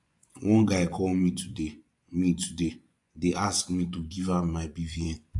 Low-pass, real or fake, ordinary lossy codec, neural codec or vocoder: 10.8 kHz; fake; AAC, 64 kbps; vocoder, 24 kHz, 100 mel bands, Vocos